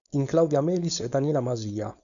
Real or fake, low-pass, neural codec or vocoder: fake; 7.2 kHz; codec, 16 kHz, 4.8 kbps, FACodec